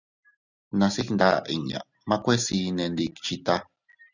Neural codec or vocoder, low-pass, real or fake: none; 7.2 kHz; real